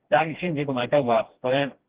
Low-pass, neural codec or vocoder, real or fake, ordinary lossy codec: 3.6 kHz; codec, 16 kHz, 1 kbps, FreqCodec, smaller model; fake; Opus, 16 kbps